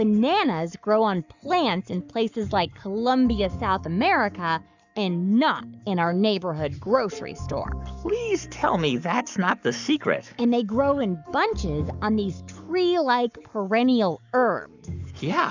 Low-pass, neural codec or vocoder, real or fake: 7.2 kHz; codec, 44.1 kHz, 7.8 kbps, Pupu-Codec; fake